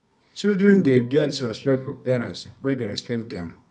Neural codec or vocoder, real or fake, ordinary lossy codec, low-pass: codec, 24 kHz, 0.9 kbps, WavTokenizer, medium music audio release; fake; none; 10.8 kHz